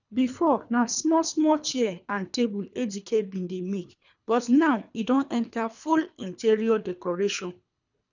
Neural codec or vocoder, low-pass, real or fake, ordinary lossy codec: codec, 24 kHz, 3 kbps, HILCodec; 7.2 kHz; fake; none